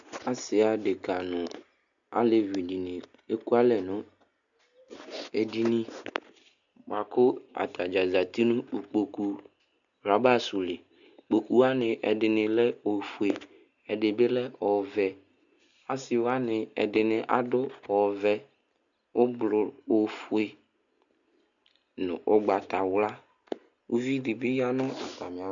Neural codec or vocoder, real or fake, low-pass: none; real; 7.2 kHz